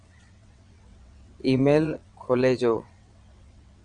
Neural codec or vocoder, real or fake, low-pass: vocoder, 22.05 kHz, 80 mel bands, WaveNeXt; fake; 9.9 kHz